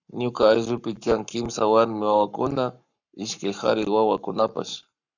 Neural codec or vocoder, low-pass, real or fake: codec, 44.1 kHz, 7.8 kbps, Pupu-Codec; 7.2 kHz; fake